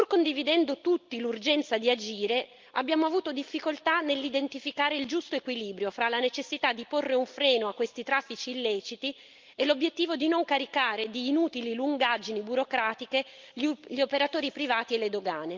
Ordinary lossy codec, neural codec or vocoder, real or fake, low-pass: Opus, 24 kbps; none; real; 7.2 kHz